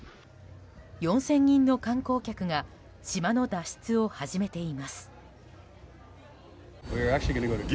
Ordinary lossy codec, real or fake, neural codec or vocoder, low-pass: none; real; none; none